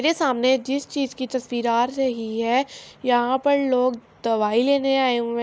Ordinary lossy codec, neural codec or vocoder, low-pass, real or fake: none; none; none; real